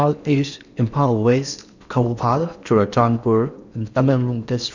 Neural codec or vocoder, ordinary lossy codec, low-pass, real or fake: codec, 16 kHz in and 24 kHz out, 0.8 kbps, FocalCodec, streaming, 65536 codes; none; 7.2 kHz; fake